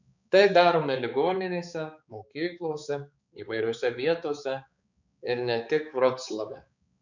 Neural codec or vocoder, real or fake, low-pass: codec, 16 kHz, 4 kbps, X-Codec, HuBERT features, trained on balanced general audio; fake; 7.2 kHz